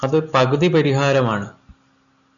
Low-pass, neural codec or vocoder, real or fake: 7.2 kHz; none; real